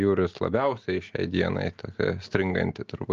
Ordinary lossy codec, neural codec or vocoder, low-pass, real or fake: Opus, 24 kbps; none; 7.2 kHz; real